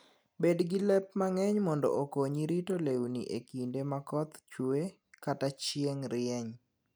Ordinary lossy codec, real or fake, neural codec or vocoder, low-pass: none; real; none; none